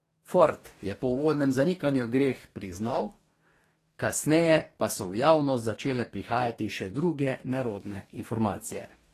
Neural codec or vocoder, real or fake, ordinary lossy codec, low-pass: codec, 44.1 kHz, 2.6 kbps, DAC; fake; AAC, 48 kbps; 14.4 kHz